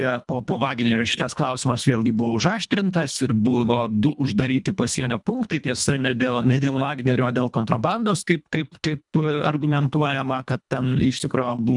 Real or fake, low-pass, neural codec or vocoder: fake; 10.8 kHz; codec, 24 kHz, 1.5 kbps, HILCodec